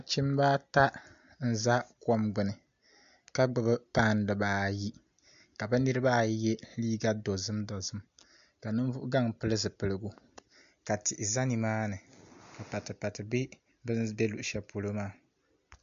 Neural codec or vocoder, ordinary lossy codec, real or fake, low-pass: none; MP3, 64 kbps; real; 7.2 kHz